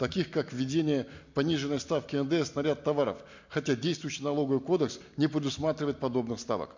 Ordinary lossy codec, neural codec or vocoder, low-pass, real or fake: MP3, 48 kbps; none; 7.2 kHz; real